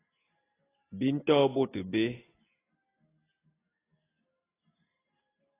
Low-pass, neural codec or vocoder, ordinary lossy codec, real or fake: 3.6 kHz; none; AAC, 16 kbps; real